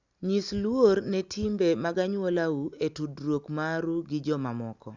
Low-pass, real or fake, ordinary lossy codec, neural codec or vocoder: 7.2 kHz; real; Opus, 64 kbps; none